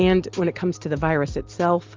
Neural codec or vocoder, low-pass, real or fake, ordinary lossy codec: none; 7.2 kHz; real; Opus, 32 kbps